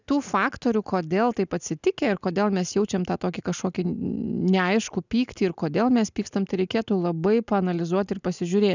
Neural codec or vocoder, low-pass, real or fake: none; 7.2 kHz; real